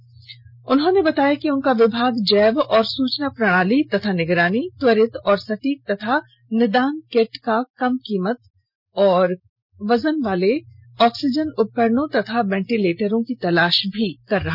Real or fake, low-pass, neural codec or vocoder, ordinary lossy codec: real; 5.4 kHz; none; none